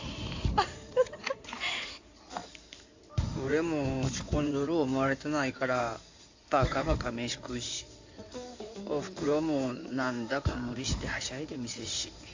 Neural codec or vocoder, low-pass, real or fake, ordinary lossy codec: codec, 16 kHz in and 24 kHz out, 2.2 kbps, FireRedTTS-2 codec; 7.2 kHz; fake; AAC, 48 kbps